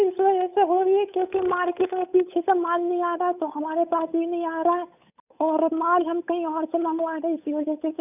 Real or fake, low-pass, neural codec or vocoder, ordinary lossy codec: fake; 3.6 kHz; codec, 16 kHz, 8 kbps, FunCodec, trained on Chinese and English, 25 frames a second; none